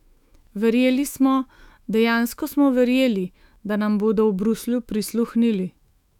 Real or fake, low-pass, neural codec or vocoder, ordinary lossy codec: fake; 19.8 kHz; autoencoder, 48 kHz, 128 numbers a frame, DAC-VAE, trained on Japanese speech; none